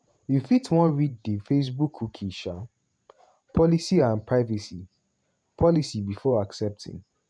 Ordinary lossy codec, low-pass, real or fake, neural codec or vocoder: none; 9.9 kHz; fake; vocoder, 48 kHz, 128 mel bands, Vocos